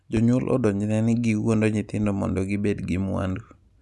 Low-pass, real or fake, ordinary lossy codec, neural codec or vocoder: none; real; none; none